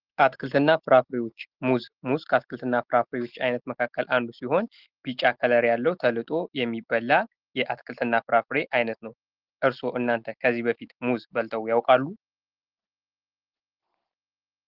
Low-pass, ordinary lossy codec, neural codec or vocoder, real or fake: 5.4 kHz; Opus, 16 kbps; none; real